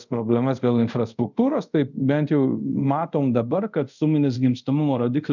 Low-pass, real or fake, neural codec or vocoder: 7.2 kHz; fake; codec, 24 kHz, 0.5 kbps, DualCodec